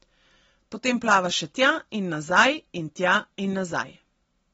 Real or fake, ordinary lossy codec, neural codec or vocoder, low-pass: real; AAC, 24 kbps; none; 14.4 kHz